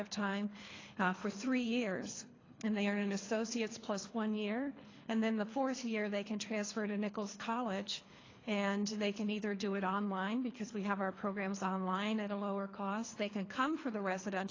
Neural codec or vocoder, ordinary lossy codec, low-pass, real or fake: codec, 24 kHz, 3 kbps, HILCodec; AAC, 32 kbps; 7.2 kHz; fake